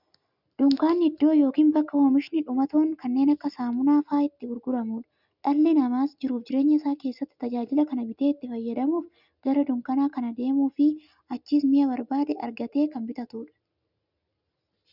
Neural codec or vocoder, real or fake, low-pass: none; real; 5.4 kHz